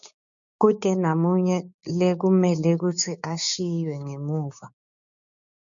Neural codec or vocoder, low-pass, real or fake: codec, 16 kHz, 6 kbps, DAC; 7.2 kHz; fake